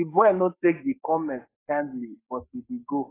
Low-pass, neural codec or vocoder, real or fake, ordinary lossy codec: 3.6 kHz; codec, 44.1 kHz, 7.8 kbps, Pupu-Codec; fake; AAC, 16 kbps